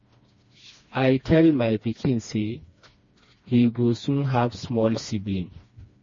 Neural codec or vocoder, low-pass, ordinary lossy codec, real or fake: codec, 16 kHz, 2 kbps, FreqCodec, smaller model; 7.2 kHz; MP3, 32 kbps; fake